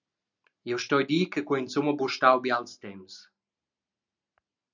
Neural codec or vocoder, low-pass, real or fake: none; 7.2 kHz; real